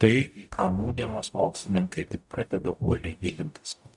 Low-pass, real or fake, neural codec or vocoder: 10.8 kHz; fake; codec, 44.1 kHz, 0.9 kbps, DAC